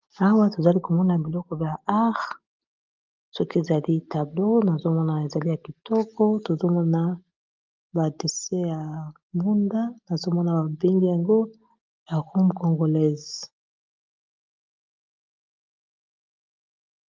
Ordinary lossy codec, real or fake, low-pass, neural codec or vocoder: Opus, 32 kbps; real; 7.2 kHz; none